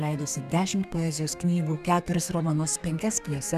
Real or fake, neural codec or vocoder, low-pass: fake; codec, 32 kHz, 1.9 kbps, SNAC; 14.4 kHz